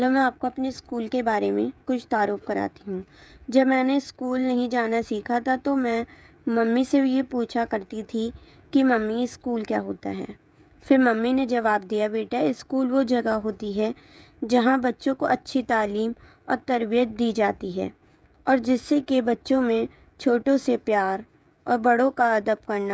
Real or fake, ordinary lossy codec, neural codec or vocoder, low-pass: fake; none; codec, 16 kHz, 16 kbps, FreqCodec, smaller model; none